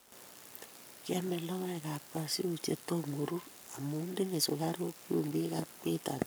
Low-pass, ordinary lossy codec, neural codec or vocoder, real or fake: none; none; codec, 44.1 kHz, 7.8 kbps, Pupu-Codec; fake